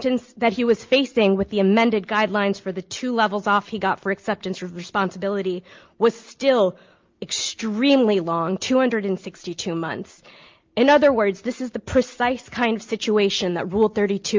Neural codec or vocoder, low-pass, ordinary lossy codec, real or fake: none; 7.2 kHz; Opus, 24 kbps; real